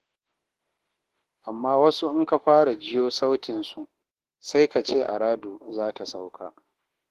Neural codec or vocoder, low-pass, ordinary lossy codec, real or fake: autoencoder, 48 kHz, 32 numbers a frame, DAC-VAE, trained on Japanese speech; 14.4 kHz; Opus, 16 kbps; fake